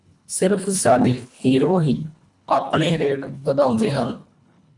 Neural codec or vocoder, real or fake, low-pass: codec, 24 kHz, 1.5 kbps, HILCodec; fake; 10.8 kHz